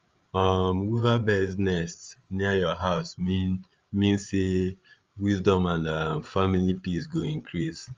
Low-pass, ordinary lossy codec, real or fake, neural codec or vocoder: 7.2 kHz; Opus, 32 kbps; fake; codec, 16 kHz, 8 kbps, FreqCodec, larger model